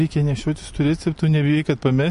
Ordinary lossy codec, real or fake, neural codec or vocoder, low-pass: MP3, 48 kbps; real; none; 14.4 kHz